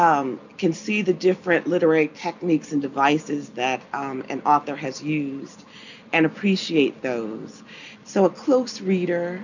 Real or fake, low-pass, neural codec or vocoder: real; 7.2 kHz; none